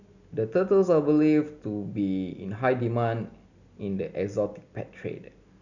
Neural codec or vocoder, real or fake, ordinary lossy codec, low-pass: none; real; none; 7.2 kHz